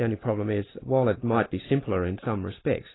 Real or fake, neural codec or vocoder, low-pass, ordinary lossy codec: real; none; 7.2 kHz; AAC, 16 kbps